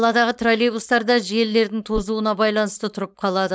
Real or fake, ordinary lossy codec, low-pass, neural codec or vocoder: fake; none; none; codec, 16 kHz, 4.8 kbps, FACodec